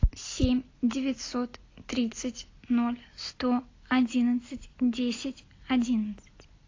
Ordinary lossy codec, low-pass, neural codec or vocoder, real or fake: AAC, 32 kbps; 7.2 kHz; none; real